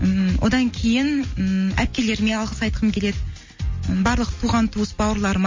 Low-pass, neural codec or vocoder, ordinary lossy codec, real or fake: 7.2 kHz; none; MP3, 32 kbps; real